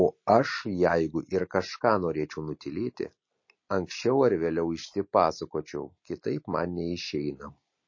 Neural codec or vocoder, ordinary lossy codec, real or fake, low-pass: none; MP3, 32 kbps; real; 7.2 kHz